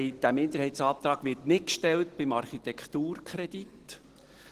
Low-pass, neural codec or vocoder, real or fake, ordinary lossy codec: 14.4 kHz; none; real; Opus, 16 kbps